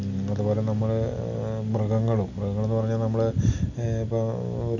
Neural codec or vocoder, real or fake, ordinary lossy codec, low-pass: none; real; none; 7.2 kHz